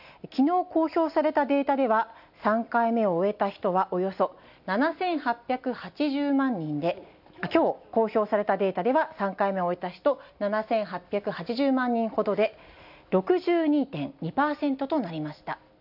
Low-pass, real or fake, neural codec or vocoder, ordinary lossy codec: 5.4 kHz; real; none; none